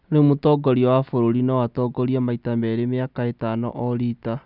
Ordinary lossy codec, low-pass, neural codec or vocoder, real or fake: none; 5.4 kHz; none; real